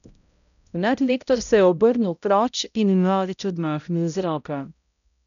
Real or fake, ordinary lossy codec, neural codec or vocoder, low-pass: fake; none; codec, 16 kHz, 0.5 kbps, X-Codec, HuBERT features, trained on balanced general audio; 7.2 kHz